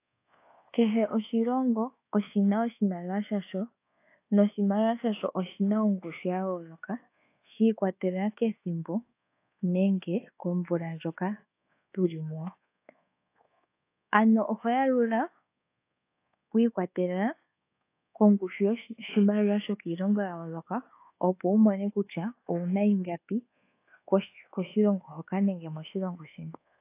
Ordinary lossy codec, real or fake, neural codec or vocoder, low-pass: AAC, 24 kbps; fake; codec, 24 kHz, 1.2 kbps, DualCodec; 3.6 kHz